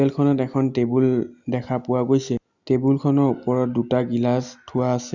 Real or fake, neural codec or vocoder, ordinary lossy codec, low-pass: real; none; Opus, 64 kbps; 7.2 kHz